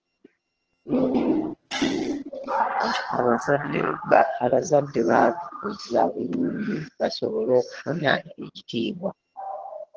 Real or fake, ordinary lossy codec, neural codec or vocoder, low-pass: fake; Opus, 16 kbps; vocoder, 22.05 kHz, 80 mel bands, HiFi-GAN; 7.2 kHz